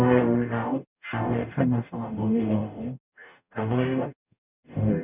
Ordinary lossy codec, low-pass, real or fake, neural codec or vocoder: none; 3.6 kHz; fake; codec, 44.1 kHz, 0.9 kbps, DAC